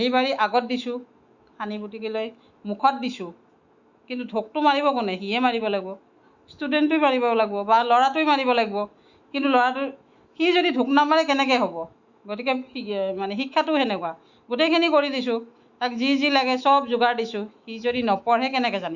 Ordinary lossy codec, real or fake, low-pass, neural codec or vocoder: Opus, 64 kbps; fake; 7.2 kHz; autoencoder, 48 kHz, 128 numbers a frame, DAC-VAE, trained on Japanese speech